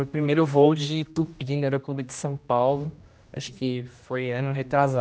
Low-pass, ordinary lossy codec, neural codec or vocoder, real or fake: none; none; codec, 16 kHz, 1 kbps, X-Codec, HuBERT features, trained on general audio; fake